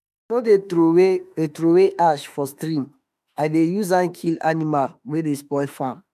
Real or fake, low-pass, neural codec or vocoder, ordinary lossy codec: fake; 14.4 kHz; autoencoder, 48 kHz, 32 numbers a frame, DAC-VAE, trained on Japanese speech; none